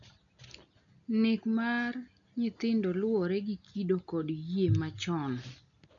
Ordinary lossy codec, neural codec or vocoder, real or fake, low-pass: none; none; real; 7.2 kHz